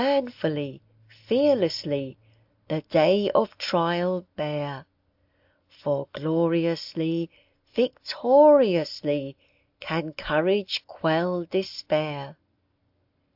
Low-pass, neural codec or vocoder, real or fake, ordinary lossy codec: 5.4 kHz; none; real; AAC, 48 kbps